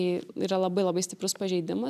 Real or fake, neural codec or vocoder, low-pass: real; none; 14.4 kHz